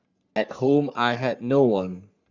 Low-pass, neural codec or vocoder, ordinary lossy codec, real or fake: 7.2 kHz; codec, 44.1 kHz, 3.4 kbps, Pupu-Codec; Opus, 64 kbps; fake